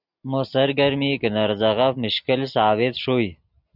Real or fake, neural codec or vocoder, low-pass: real; none; 5.4 kHz